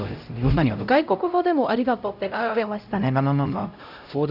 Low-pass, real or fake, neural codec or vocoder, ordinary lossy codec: 5.4 kHz; fake; codec, 16 kHz, 0.5 kbps, X-Codec, HuBERT features, trained on LibriSpeech; none